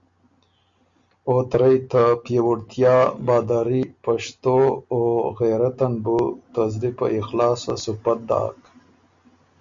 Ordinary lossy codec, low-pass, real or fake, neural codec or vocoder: Opus, 64 kbps; 7.2 kHz; real; none